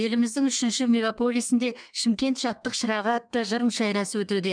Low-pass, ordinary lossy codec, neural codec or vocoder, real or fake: 9.9 kHz; none; codec, 32 kHz, 1.9 kbps, SNAC; fake